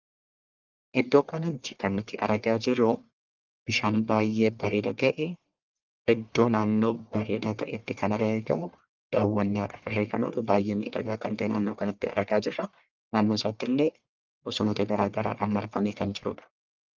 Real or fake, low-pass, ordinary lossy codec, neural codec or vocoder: fake; 7.2 kHz; Opus, 32 kbps; codec, 44.1 kHz, 1.7 kbps, Pupu-Codec